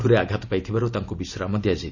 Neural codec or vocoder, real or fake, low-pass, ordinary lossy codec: none; real; 7.2 kHz; none